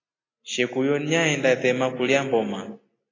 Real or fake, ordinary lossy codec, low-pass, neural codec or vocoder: real; AAC, 32 kbps; 7.2 kHz; none